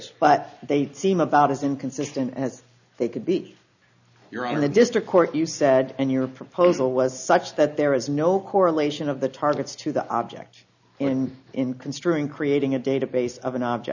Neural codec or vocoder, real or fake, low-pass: none; real; 7.2 kHz